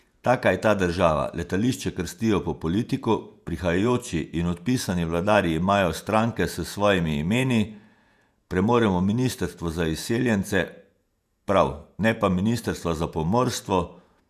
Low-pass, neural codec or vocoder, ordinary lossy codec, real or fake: 14.4 kHz; vocoder, 48 kHz, 128 mel bands, Vocos; none; fake